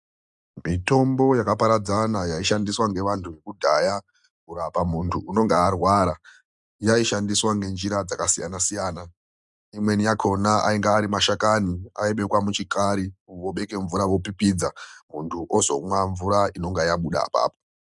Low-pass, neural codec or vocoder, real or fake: 10.8 kHz; none; real